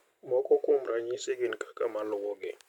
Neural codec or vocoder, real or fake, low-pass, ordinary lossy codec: none; real; 19.8 kHz; none